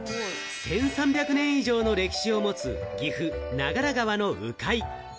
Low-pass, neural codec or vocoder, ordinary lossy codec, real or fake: none; none; none; real